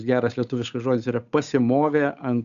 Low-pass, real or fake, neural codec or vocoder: 7.2 kHz; fake; codec, 16 kHz, 8 kbps, FunCodec, trained on Chinese and English, 25 frames a second